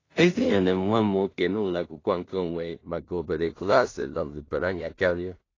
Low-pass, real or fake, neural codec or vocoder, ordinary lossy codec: 7.2 kHz; fake; codec, 16 kHz in and 24 kHz out, 0.4 kbps, LongCat-Audio-Codec, two codebook decoder; AAC, 32 kbps